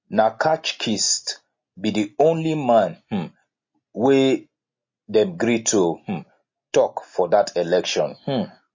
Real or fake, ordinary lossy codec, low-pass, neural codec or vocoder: real; MP3, 32 kbps; 7.2 kHz; none